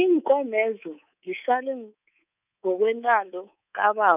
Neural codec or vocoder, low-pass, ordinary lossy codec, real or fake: codec, 24 kHz, 3.1 kbps, DualCodec; 3.6 kHz; none; fake